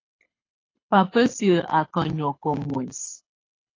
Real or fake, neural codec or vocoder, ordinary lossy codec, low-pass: fake; codec, 24 kHz, 6 kbps, HILCodec; AAC, 32 kbps; 7.2 kHz